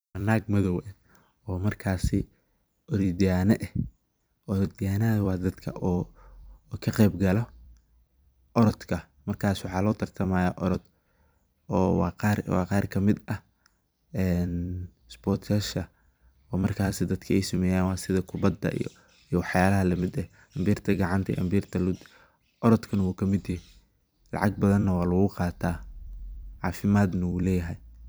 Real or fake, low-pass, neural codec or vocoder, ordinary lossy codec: fake; none; vocoder, 44.1 kHz, 128 mel bands every 256 samples, BigVGAN v2; none